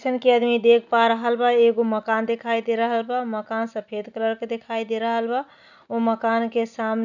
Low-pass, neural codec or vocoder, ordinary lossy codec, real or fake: 7.2 kHz; none; none; real